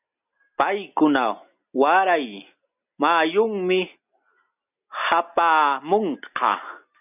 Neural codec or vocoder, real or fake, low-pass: none; real; 3.6 kHz